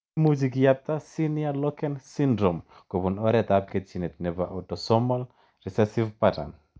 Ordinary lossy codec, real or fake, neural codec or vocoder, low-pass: none; real; none; none